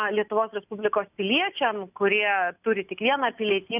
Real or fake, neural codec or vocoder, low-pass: real; none; 3.6 kHz